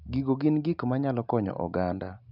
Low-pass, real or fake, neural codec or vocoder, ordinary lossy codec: 5.4 kHz; real; none; none